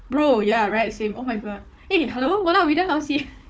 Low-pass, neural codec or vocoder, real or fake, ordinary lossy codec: none; codec, 16 kHz, 4 kbps, FunCodec, trained on Chinese and English, 50 frames a second; fake; none